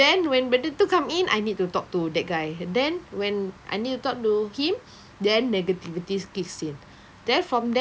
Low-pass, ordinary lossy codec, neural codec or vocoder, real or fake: none; none; none; real